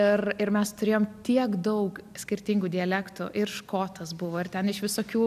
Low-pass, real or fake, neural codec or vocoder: 14.4 kHz; real; none